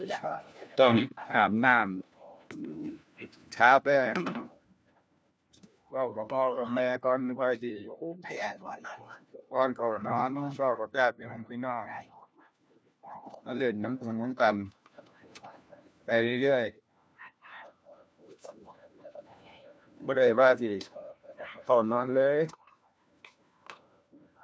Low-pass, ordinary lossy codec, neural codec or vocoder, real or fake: none; none; codec, 16 kHz, 1 kbps, FunCodec, trained on LibriTTS, 50 frames a second; fake